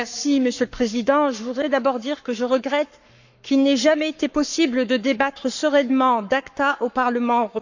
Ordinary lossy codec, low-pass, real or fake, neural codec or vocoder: none; 7.2 kHz; fake; codec, 44.1 kHz, 7.8 kbps, Pupu-Codec